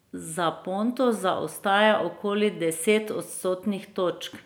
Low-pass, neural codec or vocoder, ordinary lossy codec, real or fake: none; none; none; real